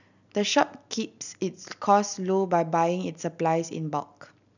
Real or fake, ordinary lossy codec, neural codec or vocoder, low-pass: real; none; none; 7.2 kHz